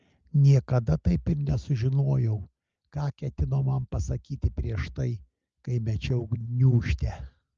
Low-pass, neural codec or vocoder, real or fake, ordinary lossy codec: 7.2 kHz; none; real; Opus, 24 kbps